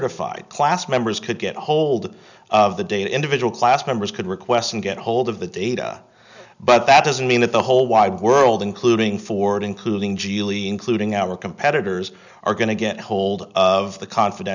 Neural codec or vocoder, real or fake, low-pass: none; real; 7.2 kHz